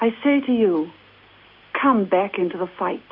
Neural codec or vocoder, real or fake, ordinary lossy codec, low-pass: none; real; MP3, 32 kbps; 5.4 kHz